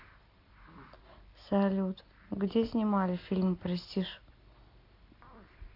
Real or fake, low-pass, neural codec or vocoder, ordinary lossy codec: real; 5.4 kHz; none; AAC, 32 kbps